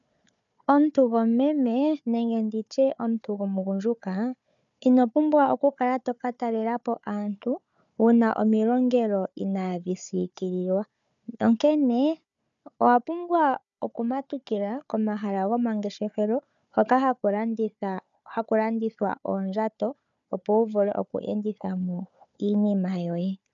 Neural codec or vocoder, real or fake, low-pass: codec, 16 kHz, 4 kbps, FunCodec, trained on Chinese and English, 50 frames a second; fake; 7.2 kHz